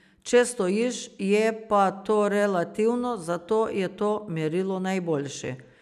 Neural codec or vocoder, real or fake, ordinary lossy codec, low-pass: none; real; none; 14.4 kHz